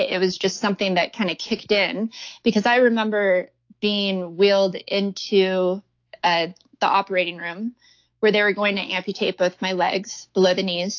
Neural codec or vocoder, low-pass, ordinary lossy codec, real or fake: autoencoder, 48 kHz, 128 numbers a frame, DAC-VAE, trained on Japanese speech; 7.2 kHz; AAC, 48 kbps; fake